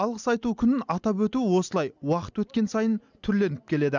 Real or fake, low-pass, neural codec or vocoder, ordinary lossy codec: real; 7.2 kHz; none; none